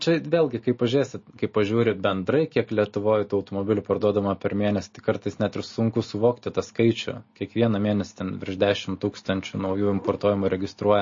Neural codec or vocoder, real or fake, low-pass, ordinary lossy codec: none; real; 7.2 kHz; MP3, 32 kbps